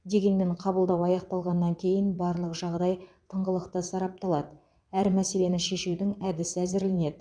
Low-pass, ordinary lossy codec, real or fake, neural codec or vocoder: 9.9 kHz; none; fake; vocoder, 22.05 kHz, 80 mel bands, WaveNeXt